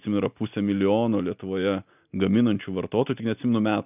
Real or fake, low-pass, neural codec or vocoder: real; 3.6 kHz; none